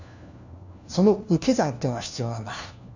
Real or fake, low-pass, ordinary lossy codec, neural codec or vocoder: fake; 7.2 kHz; none; codec, 16 kHz, 1 kbps, FunCodec, trained on LibriTTS, 50 frames a second